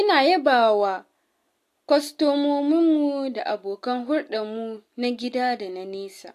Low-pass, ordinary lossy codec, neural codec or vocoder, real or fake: 14.4 kHz; AAC, 64 kbps; none; real